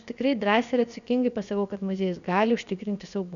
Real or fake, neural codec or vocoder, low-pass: fake; codec, 16 kHz, about 1 kbps, DyCAST, with the encoder's durations; 7.2 kHz